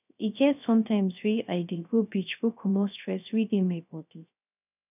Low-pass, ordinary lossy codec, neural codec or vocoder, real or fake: 3.6 kHz; none; codec, 16 kHz, 0.3 kbps, FocalCodec; fake